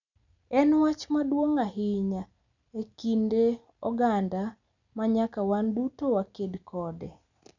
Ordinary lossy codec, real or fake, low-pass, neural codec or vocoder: none; real; 7.2 kHz; none